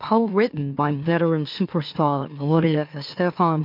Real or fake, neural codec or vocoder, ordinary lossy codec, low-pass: fake; autoencoder, 44.1 kHz, a latent of 192 numbers a frame, MeloTTS; MP3, 48 kbps; 5.4 kHz